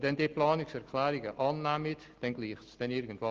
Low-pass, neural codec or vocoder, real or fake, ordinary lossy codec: 7.2 kHz; none; real; Opus, 16 kbps